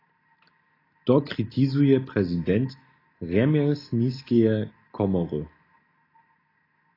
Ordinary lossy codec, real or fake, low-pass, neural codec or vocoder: AAC, 24 kbps; real; 5.4 kHz; none